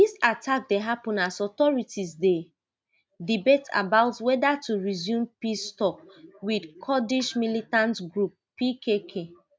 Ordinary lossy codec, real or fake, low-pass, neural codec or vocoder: none; real; none; none